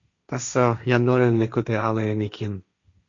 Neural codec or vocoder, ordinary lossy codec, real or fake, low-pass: codec, 16 kHz, 1.1 kbps, Voila-Tokenizer; MP3, 64 kbps; fake; 7.2 kHz